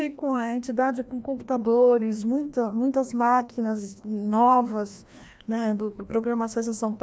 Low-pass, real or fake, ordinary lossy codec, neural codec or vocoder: none; fake; none; codec, 16 kHz, 1 kbps, FreqCodec, larger model